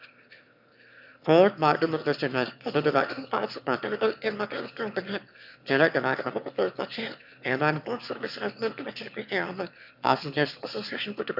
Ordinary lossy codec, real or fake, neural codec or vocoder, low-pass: none; fake; autoencoder, 22.05 kHz, a latent of 192 numbers a frame, VITS, trained on one speaker; 5.4 kHz